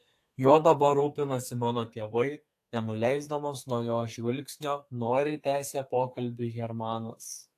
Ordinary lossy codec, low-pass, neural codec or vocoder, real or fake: AAC, 64 kbps; 14.4 kHz; codec, 32 kHz, 1.9 kbps, SNAC; fake